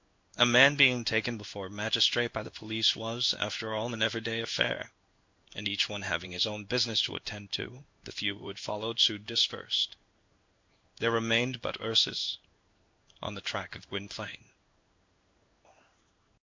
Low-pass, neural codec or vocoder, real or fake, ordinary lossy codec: 7.2 kHz; codec, 16 kHz in and 24 kHz out, 1 kbps, XY-Tokenizer; fake; MP3, 48 kbps